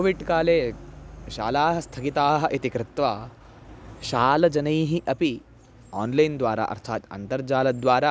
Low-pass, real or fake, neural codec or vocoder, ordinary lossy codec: none; real; none; none